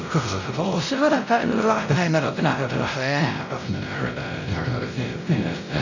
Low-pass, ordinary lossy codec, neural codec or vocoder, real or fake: 7.2 kHz; none; codec, 16 kHz, 0.5 kbps, X-Codec, WavLM features, trained on Multilingual LibriSpeech; fake